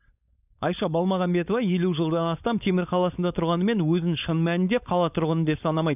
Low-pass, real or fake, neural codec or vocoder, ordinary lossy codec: 3.6 kHz; fake; codec, 16 kHz, 4.8 kbps, FACodec; none